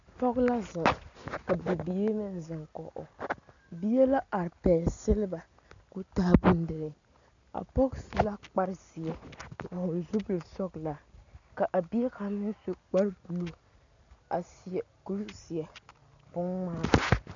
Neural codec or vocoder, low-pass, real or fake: none; 7.2 kHz; real